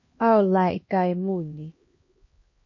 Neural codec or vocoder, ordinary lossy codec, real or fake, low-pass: codec, 16 kHz, 1 kbps, X-Codec, HuBERT features, trained on LibriSpeech; MP3, 32 kbps; fake; 7.2 kHz